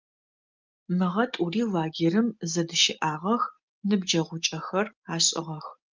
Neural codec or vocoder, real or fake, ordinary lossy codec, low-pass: none; real; Opus, 32 kbps; 7.2 kHz